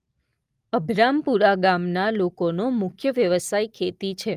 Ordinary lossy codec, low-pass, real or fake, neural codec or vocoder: Opus, 32 kbps; 14.4 kHz; real; none